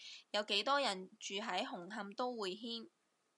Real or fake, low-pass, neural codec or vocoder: real; 10.8 kHz; none